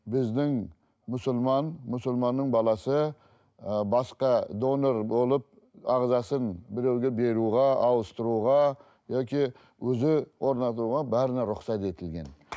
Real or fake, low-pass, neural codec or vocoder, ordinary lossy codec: real; none; none; none